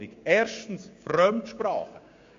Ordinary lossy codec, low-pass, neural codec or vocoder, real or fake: MP3, 48 kbps; 7.2 kHz; codec, 16 kHz, 6 kbps, DAC; fake